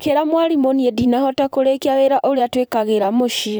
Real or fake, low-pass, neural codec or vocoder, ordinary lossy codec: fake; none; vocoder, 44.1 kHz, 128 mel bands, Pupu-Vocoder; none